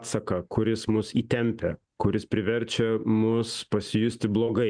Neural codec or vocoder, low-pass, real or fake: none; 9.9 kHz; real